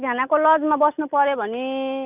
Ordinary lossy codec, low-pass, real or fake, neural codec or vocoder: none; 3.6 kHz; real; none